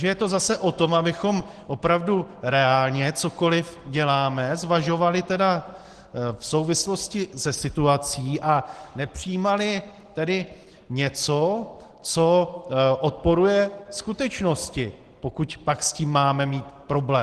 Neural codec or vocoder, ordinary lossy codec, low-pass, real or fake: none; Opus, 16 kbps; 10.8 kHz; real